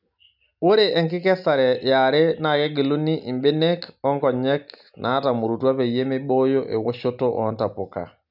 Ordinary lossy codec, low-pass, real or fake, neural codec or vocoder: none; 5.4 kHz; real; none